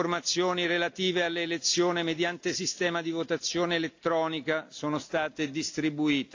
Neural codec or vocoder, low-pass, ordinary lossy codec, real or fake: none; 7.2 kHz; AAC, 48 kbps; real